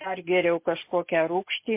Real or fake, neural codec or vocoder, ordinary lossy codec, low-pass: real; none; MP3, 24 kbps; 3.6 kHz